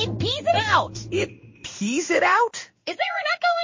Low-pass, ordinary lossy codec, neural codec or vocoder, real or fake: 7.2 kHz; MP3, 32 kbps; codec, 16 kHz in and 24 kHz out, 1 kbps, XY-Tokenizer; fake